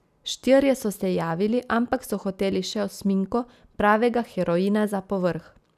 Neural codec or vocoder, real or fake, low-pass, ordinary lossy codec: none; real; 14.4 kHz; none